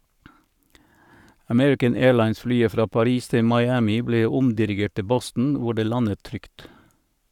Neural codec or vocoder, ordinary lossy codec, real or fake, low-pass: codec, 44.1 kHz, 7.8 kbps, Pupu-Codec; none; fake; 19.8 kHz